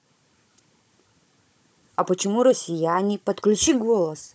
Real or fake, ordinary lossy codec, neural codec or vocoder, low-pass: fake; none; codec, 16 kHz, 16 kbps, FunCodec, trained on Chinese and English, 50 frames a second; none